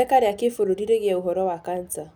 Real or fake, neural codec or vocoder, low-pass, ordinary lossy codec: real; none; none; none